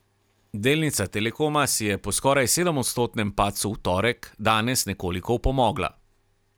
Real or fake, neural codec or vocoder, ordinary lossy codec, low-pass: real; none; none; none